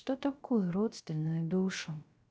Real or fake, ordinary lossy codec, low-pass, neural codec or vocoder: fake; none; none; codec, 16 kHz, 0.3 kbps, FocalCodec